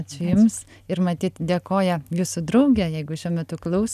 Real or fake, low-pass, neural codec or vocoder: real; 14.4 kHz; none